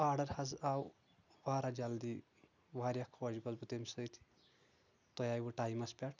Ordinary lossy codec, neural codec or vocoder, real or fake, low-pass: none; none; real; 7.2 kHz